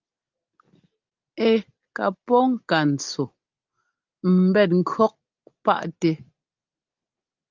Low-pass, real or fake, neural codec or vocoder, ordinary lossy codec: 7.2 kHz; real; none; Opus, 32 kbps